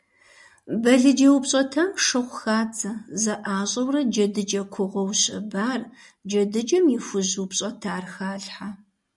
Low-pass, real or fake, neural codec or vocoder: 10.8 kHz; real; none